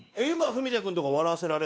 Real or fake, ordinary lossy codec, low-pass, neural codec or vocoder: fake; none; none; codec, 16 kHz, 2 kbps, X-Codec, WavLM features, trained on Multilingual LibriSpeech